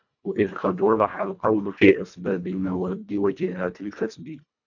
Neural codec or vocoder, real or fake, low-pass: codec, 24 kHz, 1.5 kbps, HILCodec; fake; 7.2 kHz